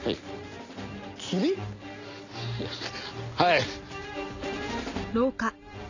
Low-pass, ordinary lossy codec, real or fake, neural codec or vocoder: 7.2 kHz; none; real; none